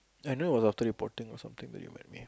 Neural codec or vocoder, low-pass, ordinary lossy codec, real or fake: none; none; none; real